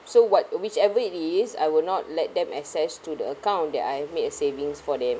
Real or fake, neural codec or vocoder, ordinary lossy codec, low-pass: real; none; none; none